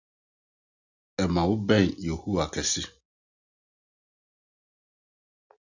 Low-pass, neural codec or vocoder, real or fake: 7.2 kHz; none; real